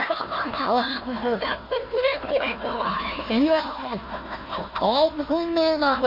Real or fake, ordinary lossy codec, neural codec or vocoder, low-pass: fake; MP3, 32 kbps; codec, 16 kHz, 1 kbps, FunCodec, trained on Chinese and English, 50 frames a second; 5.4 kHz